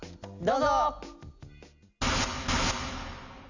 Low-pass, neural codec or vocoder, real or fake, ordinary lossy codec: 7.2 kHz; none; real; none